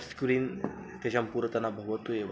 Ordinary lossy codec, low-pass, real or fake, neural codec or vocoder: none; none; real; none